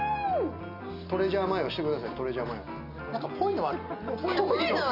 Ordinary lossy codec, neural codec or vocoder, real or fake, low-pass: none; none; real; 5.4 kHz